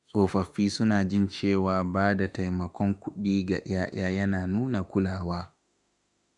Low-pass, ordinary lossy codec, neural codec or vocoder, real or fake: 10.8 kHz; none; autoencoder, 48 kHz, 32 numbers a frame, DAC-VAE, trained on Japanese speech; fake